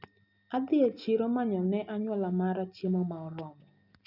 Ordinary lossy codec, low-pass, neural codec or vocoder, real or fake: none; 5.4 kHz; none; real